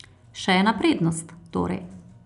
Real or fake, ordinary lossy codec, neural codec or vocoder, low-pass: real; none; none; 10.8 kHz